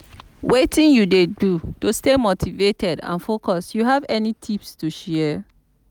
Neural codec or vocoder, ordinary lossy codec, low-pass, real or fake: none; none; none; real